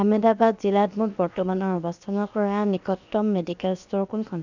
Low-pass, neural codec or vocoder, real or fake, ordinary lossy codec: 7.2 kHz; codec, 16 kHz, about 1 kbps, DyCAST, with the encoder's durations; fake; none